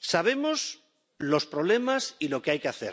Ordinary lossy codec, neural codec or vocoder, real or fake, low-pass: none; none; real; none